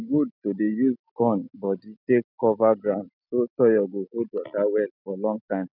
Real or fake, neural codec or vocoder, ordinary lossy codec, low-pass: real; none; none; 5.4 kHz